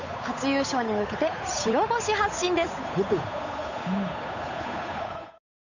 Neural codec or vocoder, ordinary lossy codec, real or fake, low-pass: codec, 16 kHz, 8 kbps, FunCodec, trained on Chinese and English, 25 frames a second; none; fake; 7.2 kHz